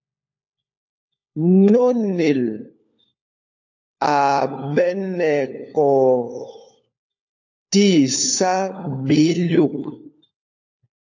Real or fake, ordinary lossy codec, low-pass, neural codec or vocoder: fake; AAC, 48 kbps; 7.2 kHz; codec, 16 kHz, 4 kbps, FunCodec, trained on LibriTTS, 50 frames a second